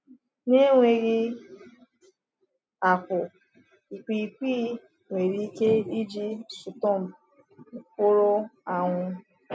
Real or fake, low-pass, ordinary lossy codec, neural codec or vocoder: real; none; none; none